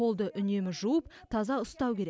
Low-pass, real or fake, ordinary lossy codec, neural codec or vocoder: none; real; none; none